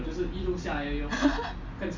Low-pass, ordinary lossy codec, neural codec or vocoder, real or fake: 7.2 kHz; MP3, 48 kbps; none; real